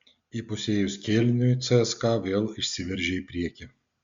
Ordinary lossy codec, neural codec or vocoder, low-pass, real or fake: Opus, 64 kbps; none; 7.2 kHz; real